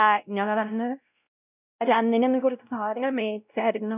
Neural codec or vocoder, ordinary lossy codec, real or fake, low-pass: codec, 16 kHz, 0.5 kbps, X-Codec, WavLM features, trained on Multilingual LibriSpeech; none; fake; 3.6 kHz